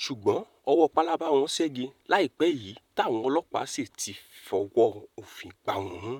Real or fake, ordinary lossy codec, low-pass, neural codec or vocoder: fake; none; 19.8 kHz; vocoder, 44.1 kHz, 128 mel bands, Pupu-Vocoder